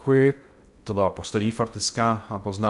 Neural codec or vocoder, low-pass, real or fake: codec, 16 kHz in and 24 kHz out, 0.6 kbps, FocalCodec, streaming, 2048 codes; 10.8 kHz; fake